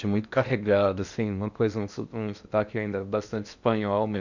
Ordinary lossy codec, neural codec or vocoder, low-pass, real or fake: none; codec, 16 kHz in and 24 kHz out, 0.8 kbps, FocalCodec, streaming, 65536 codes; 7.2 kHz; fake